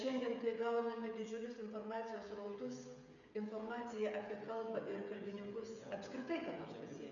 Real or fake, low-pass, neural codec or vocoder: fake; 7.2 kHz; codec, 16 kHz, 16 kbps, FreqCodec, smaller model